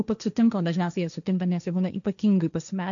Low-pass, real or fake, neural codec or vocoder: 7.2 kHz; fake; codec, 16 kHz, 1.1 kbps, Voila-Tokenizer